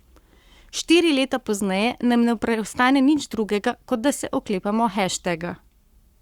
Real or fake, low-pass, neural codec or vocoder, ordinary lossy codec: fake; 19.8 kHz; codec, 44.1 kHz, 7.8 kbps, Pupu-Codec; Opus, 64 kbps